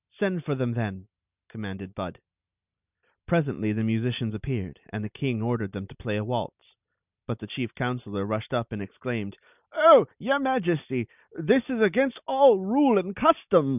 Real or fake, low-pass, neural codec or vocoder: real; 3.6 kHz; none